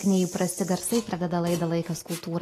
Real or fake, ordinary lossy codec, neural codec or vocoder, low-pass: real; AAC, 48 kbps; none; 14.4 kHz